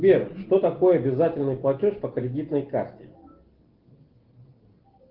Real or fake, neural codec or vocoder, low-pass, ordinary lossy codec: real; none; 5.4 kHz; Opus, 16 kbps